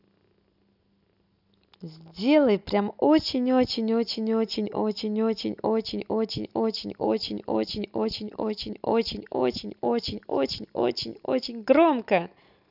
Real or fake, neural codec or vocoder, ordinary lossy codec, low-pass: real; none; none; 5.4 kHz